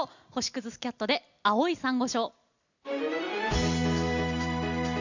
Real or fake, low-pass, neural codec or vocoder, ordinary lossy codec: real; 7.2 kHz; none; none